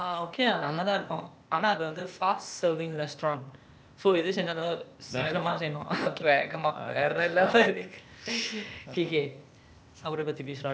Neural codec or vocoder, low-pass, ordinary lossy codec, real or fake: codec, 16 kHz, 0.8 kbps, ZipCodec; none; none; fake